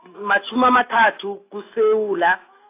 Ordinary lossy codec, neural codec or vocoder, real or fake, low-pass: AAC, 24 kbps; autoencoder, 48 kHz, 128 numbers a frame, DAC-VAE, trained on Japanese speech; fake; 3.6 kHz